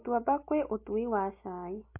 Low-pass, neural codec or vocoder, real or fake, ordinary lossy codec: 3.6 kHz; none; real; MP3, 24 kbps